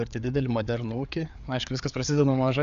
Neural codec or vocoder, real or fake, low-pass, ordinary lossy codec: codec, 16 kHz, 4 kbps, FreqCodec, larger model; fake; 7.2 kHz; AAC, 96 kbps